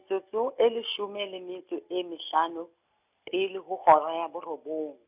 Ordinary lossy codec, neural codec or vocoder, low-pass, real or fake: none; none; 3.6 kHz; real